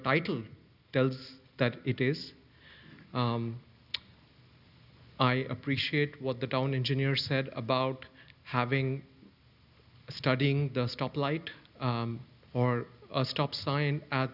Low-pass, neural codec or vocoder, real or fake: 5.4 kHz; none; real